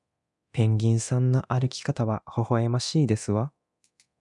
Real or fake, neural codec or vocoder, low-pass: fake; codec, 24 kHz, 0.9 kbps, DualCodec; 10.8 kHz